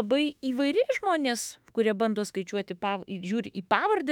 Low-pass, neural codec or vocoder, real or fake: 19.8 kHz; autoencoder, 48 kHz, 32 numbers a frame, DAC-VAE, trained on Japanese speech; fake